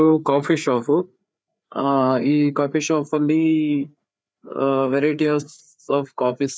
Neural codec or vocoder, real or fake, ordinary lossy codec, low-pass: codec, 16 kHz, 4 kbps, FreqCodec, larger model; fake; none; none